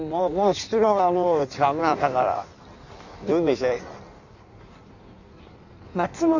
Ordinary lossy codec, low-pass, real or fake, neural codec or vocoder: none; 7.2 kHz; fake; codec, 16 kHz in and 24 kHz out, 1.1 kbps, FireRedTTS-2 codec